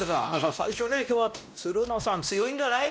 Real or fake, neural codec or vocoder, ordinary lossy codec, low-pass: fake; codec, 16 kHz, 1 kbps, X-Codec, WavLM features, trained on Multilingual LibriSpeech; none; none